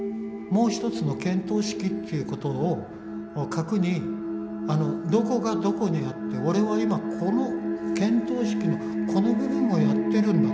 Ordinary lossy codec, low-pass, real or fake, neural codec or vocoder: none; none; real; none